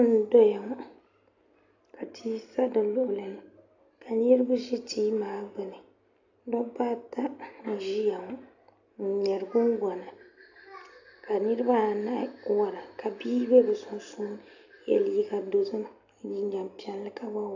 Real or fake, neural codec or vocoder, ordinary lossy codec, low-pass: real; none; AAC, 48 kbps; 7.2 kHz